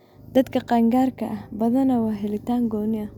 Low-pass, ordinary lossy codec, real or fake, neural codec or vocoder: 19.8 kHz; none; real; none